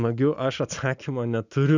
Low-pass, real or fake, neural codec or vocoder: 7.2 kHz; real; none